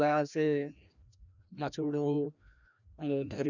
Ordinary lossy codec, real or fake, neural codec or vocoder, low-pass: none; fake; codec, 16 kHz, 1 kbps, FreqCodec, larger model; 7.2 kHz